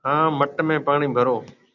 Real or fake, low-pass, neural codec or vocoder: real; 7.2 kHz; none